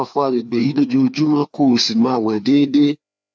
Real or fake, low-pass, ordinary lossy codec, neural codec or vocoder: fake; none; none; codec, 16 kHz, 2 kbps, FreqCodec, larger model